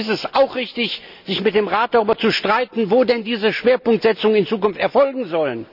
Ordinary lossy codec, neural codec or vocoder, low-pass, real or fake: none; none; 5.4 kHz; real